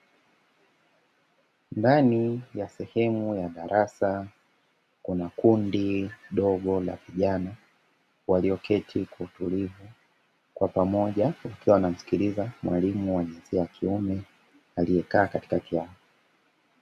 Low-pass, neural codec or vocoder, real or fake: 14.4 kHz; none; real